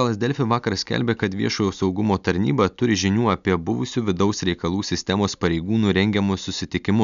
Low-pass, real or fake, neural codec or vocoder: 7.2 kHz; real; none